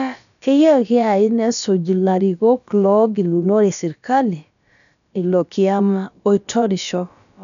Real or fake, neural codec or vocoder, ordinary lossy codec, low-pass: fake; codec, 16 kHz, about 1 kbps, DyCAST, with the encoder's durations; none; 7.2 kHz